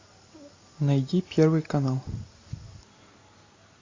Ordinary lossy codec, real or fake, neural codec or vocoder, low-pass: AAC, 32 kbps; real; none; 7.2 kHz